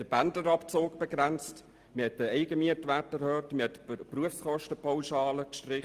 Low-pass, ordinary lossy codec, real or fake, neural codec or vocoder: 14.4 kHz; Opus, 24 kbps; real; none